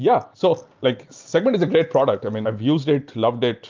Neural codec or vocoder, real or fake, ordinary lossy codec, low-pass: none; real; Opus, 24 kbps; 7.2 kHz